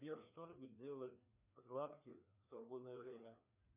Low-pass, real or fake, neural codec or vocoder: 3.6 kHz; fake; codec, 16 kHz, 2 kbps, FreqCodec, larger model